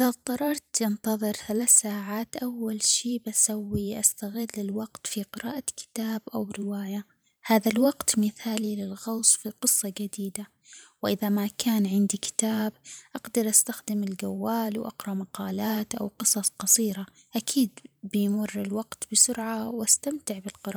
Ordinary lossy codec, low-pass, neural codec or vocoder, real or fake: none; none; vocoder, 44.1 kHz, 128 mel bands every 512 samples, BigVGAN v2; fake